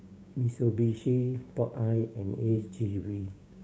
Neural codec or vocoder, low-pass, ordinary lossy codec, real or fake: codec, 16 kHz, 6 kbps, DAC; none; none; fake